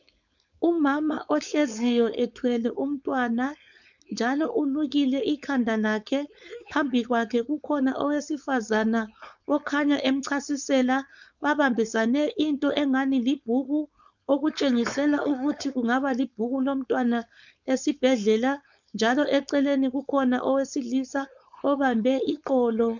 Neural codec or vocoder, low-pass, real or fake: codec, 16 kHz, 4.8 kbps, FACodec; 7.2 kHz; fake